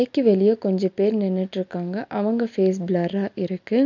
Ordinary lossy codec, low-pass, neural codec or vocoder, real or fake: none; 7.2 kHz; none; real